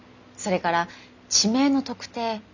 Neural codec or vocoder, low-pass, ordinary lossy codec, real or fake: none; 7.2 kHz; none; real